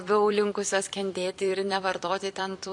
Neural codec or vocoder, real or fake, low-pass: vocoder, 44.1 kHz, 128 mel bands every 256 samples, BigVGAN v2; fake; 10.8 kHz